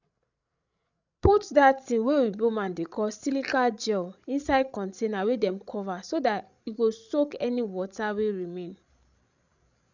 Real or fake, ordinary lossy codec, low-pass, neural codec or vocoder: fake; none; 7.2 kHz; codec, 16 kHz, 16 kbps, FreqCodec, larger model